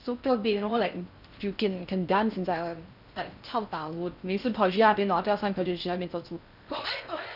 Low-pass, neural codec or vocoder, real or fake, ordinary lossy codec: 5.4 kHz; codec, 16 kHz in and 24 kHz out, 0.6 kbps, FocalCodec, streaming, 4096 codes; fake; none